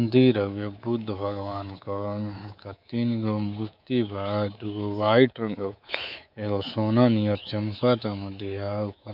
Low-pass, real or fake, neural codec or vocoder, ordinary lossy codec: 5.4 kHz; fake; codec, 24 kHz, 3.1 kbps, DualCodec; none